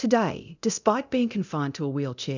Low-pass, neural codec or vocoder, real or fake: 7.2 kHz; codec, 24 kHz, 0.9 kbps, DualCodec; fake